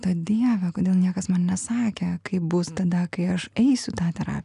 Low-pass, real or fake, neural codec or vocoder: 10.8 kHz; real; none